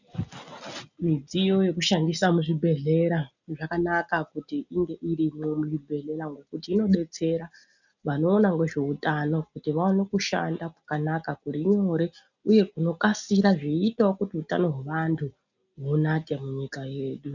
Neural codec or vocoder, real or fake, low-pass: none; real; 7.2 kHz